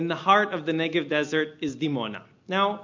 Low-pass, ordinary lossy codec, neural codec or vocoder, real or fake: 7.2 kHz; MP3, 48 kbps; none; real